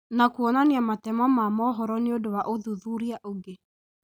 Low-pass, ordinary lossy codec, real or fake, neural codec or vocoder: none; none; real; none